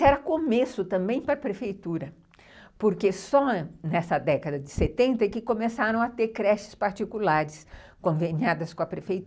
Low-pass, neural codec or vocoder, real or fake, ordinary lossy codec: none; none; real; none